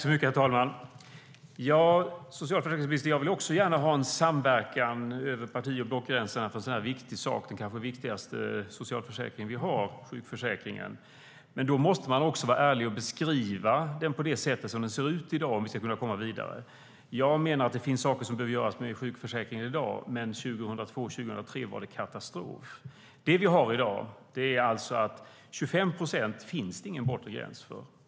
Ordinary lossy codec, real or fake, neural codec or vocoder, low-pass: none; real; none; none